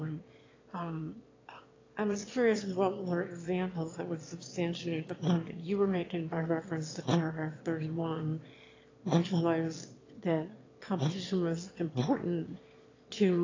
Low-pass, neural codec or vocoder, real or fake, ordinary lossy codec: 7.2 kHz; autoencoder, 22.05 kHz, a latent of 192 numbers a frame, VITS, trained on one speaker; fake; AAC, 32 kbps